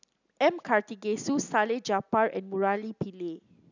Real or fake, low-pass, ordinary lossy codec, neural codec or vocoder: real; 7.2 kHz; none; none